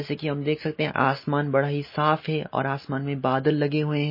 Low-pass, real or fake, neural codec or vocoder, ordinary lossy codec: 5.4 kHz; real; none; MP3, 24 kbps